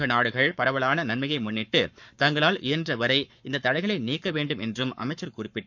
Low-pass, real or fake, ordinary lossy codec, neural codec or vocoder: 7.2 kHz; fake; none; codec, 44.1 kHz, 7.8 kbps, Pupu-Codec